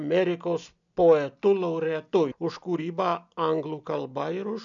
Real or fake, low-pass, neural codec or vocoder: real; 7.2 kHz; none